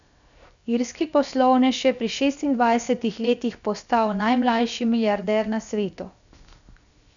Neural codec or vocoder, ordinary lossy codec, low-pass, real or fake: codec, 16 kHz, 0.7 kbps, FocalCodec; none; 7.2 kHz; fake